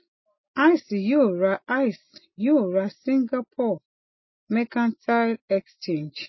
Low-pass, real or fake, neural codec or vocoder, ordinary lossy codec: 7.2 kHz; real; none; MP3, 24 kbps